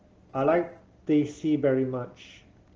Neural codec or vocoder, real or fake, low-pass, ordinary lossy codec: none; real; 7.2 kHz; Opus, 16 kbps